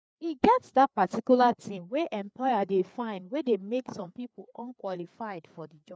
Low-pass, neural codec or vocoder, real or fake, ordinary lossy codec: none; codec, 16 kHz, 4 kbps, FreqCodec, larger model; fake; none